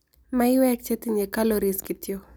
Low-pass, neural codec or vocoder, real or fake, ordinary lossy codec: none; none; real; none